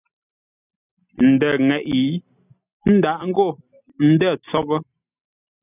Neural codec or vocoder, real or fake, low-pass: none; real; 3.6 kHz